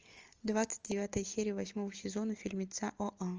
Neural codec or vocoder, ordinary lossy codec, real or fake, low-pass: none; Opus, 24 kbps; real; 7.2 kHz